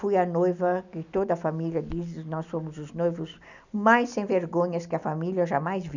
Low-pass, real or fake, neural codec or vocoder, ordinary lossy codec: 7.2 kHz; real; none; none